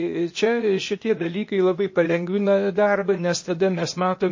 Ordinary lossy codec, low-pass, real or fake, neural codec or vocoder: MP3, 32 kbps; 7.2 kHz; fake; codec, 16 kHz, 0.8 kbps, ZipCodec